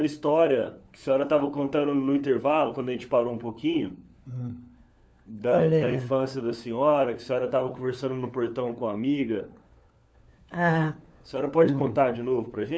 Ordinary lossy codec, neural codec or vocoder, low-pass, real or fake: none; codec, 16 kHz, 4 kbps, FunCodec, trained on LibriTTS, 50 frames a second; none; fake